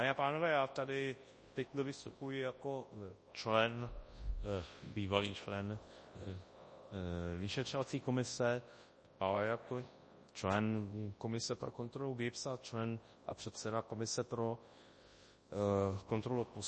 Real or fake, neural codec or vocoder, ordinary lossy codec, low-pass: fake; codec, 24 kHz, 0.9 kbps, WavTokenizer, large speech release; MP3, 32 kbps; 10.8 kHz